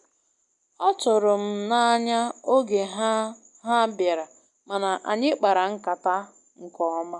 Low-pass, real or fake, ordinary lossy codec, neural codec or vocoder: 10.8 kHz; real; none; none